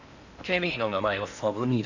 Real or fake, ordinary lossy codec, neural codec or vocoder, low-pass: fake; none; codec, 16 kHz in and 24 kHz out, 0.8 kbps, FocalCodec, streaming, 65536 codes; 7.2 kHz